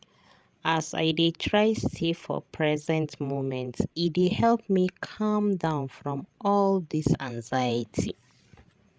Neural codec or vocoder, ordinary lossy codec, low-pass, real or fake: codec, 16 kHz, 16 kbps, FreqCodec, larger model; none; none; fake